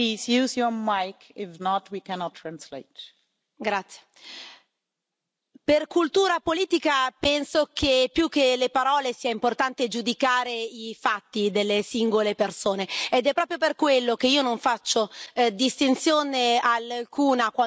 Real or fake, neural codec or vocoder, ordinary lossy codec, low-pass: real; none; none; none